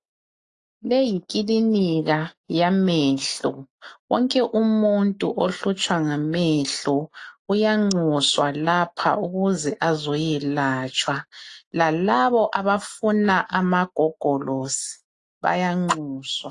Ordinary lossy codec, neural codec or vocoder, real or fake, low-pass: AAC, 48 kbps; none; real; 10.8 kHz